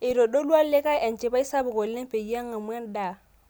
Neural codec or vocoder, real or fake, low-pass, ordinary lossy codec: none; real; none; none